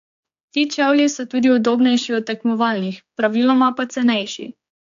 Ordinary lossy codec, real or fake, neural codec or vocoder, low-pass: AAC, 64 kbps; fake; codec, 16 kHz, 4 kbps, X-Codec, HuBERT features, trained on general audio; 7.2 kHz